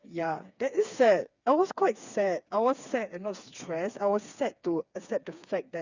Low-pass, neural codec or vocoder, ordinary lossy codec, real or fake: 7.2 kHz; codec, 16 kHz, 4 kbps, FreqCodec, smaller model; Opus, 64 kbps; fake